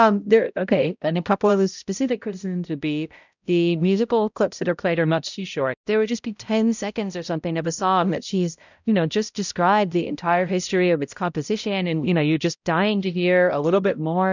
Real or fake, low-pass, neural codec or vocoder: fake; 7.2 kHz; codec, 16 kHz, 0.5 kbps, X-Codec, HuBERT features, trained on balanced general audio